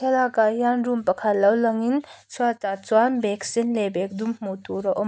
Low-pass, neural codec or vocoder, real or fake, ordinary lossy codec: none; none; real; none